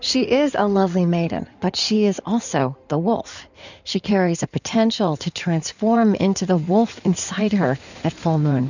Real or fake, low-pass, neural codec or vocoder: fake; 7.2 kHz; codec, 16 kHz in and 24 kHz out, 2.2 kbps, FireRedTTS-2 codec